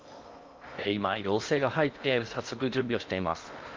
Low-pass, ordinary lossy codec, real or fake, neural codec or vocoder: 7.2 kHz; Opus, 24 kbps; fake; codec, 16 kHz in and 24 kHz out, 0.8 kbps, FocalCodec, streaming, 65536 codes